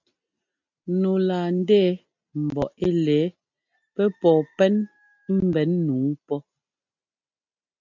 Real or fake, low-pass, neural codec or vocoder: real; 7.2 kHz; none